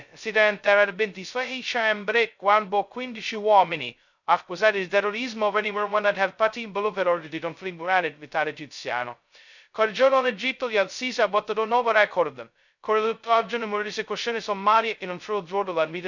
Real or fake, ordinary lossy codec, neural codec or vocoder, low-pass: fake; none; codec, 16 kHz, 0.2 kbps, FocalCodec; 7.2 kHz